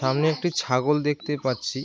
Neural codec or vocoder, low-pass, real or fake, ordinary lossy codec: none; none; real; none